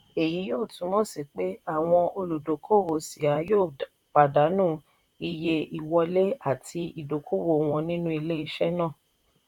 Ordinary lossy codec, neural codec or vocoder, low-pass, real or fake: none; vocoder, 44.1 kHz, 128 mel bands, Pupu-Vocoder; 19.8 kHz; fake